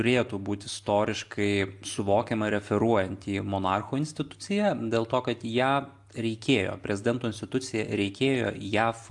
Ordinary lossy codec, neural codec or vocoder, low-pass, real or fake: AAC, 64 kbps; none; 10.8 kHz; real